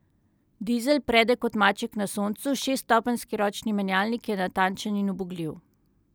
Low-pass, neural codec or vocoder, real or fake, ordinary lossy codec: none; none; real; none